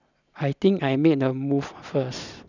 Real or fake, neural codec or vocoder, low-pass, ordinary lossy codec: real; none; 7.2 kHz; none